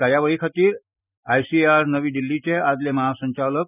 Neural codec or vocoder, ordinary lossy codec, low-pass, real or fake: none; none; 3.6 kHz; real